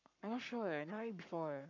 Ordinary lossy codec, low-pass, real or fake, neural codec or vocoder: none; 7.2 kHz; fake; codec, 44.1 kHz, 7.8 kbps, Pupu-Codec